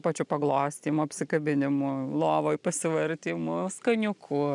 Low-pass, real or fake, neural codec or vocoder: 10.8 kHz; real; none